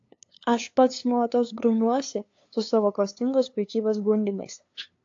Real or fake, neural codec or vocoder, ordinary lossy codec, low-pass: fake; codec, 16 kHz, 2 kbps, FunCodec, trained on LibriTTS, 25 frames a second; AAC, 48 kbps; 7.2 kHz